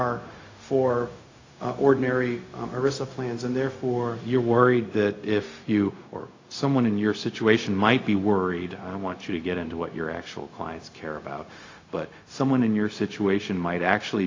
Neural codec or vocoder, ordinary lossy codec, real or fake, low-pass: codec, 16 kHz, 0.4 kbps, LongCat-Audio-Codec; AAC, 32 kbps; fake; 7.2 kHz